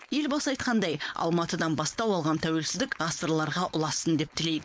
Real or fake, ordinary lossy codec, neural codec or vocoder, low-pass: fake; none; codec, 16 kHz, 4.8 kbps, FACodec; none